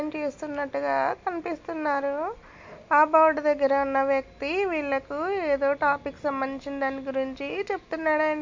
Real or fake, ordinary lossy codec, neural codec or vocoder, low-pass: real; MP3, 48 kbps; none; 7.2 kHz